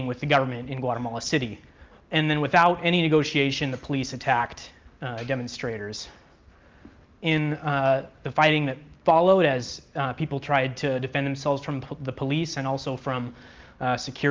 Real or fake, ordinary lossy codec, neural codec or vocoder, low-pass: real; Opus, 24 kbps; none; 7.2 kHz